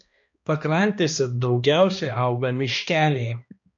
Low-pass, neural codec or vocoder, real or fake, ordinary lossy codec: 7.2 kHz; codec, 16 kHz, 1 kbps, X-Codec, HuBERT features, trained on balanced general audio; fake; MP3, 48 kbps